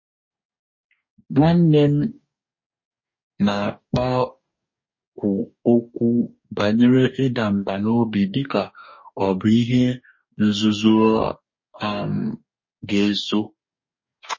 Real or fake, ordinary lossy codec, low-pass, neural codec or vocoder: fake; MP3, 32 kbps; 7.2 kHz; codec, 44.1 kHz, 2.6 kbps, DAC